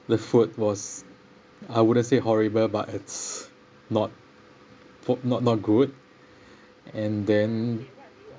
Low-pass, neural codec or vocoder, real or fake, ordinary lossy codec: none; none; real; none